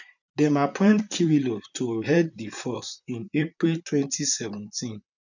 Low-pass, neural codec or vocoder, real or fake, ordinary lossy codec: 7.2 kHz; vocoder, 44.1 kHz, 128 mel bands, Pupu-Vocoder; fake; none